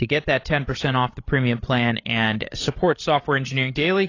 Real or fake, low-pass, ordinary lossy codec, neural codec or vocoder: fake; 7.2 kHz; AAC, 32 kbps; codec, 16 kHz, 16 kbps, FreqCodec, larger model